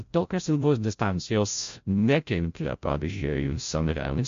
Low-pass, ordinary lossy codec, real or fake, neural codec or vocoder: 7.2 kHz; MP3, 48 kbps; fake; codec, 16 kHz, 0.5 kbps, FreqCodec, larger model